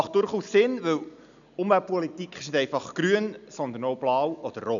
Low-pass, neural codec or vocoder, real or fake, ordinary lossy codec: 7.2 kHz; none; real; none